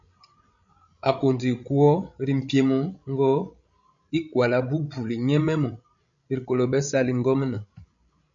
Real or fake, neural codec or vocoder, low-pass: fake; codec, 16 kHz, 16 kbps, FreqCodec, larger model; 7.2 kHz